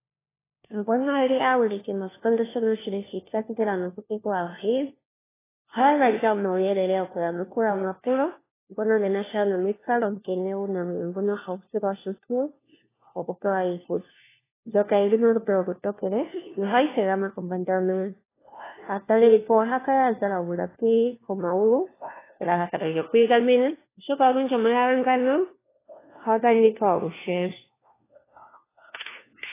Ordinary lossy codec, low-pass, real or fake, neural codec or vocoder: AAC, 16 kbps; 3.6 kHz; fake; codec, 16 kHz, 1 kbps, FunCodec, trained on LibriTTS, 50 frames a second